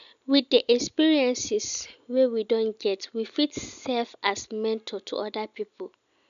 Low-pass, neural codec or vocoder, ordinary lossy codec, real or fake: 7.2 kHz; none; none; real